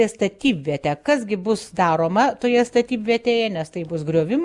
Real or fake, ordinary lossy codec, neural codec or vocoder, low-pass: real; Opus, 64 kbps; none; 10.8 kHz